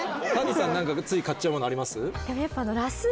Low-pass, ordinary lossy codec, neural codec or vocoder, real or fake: none; none; none; real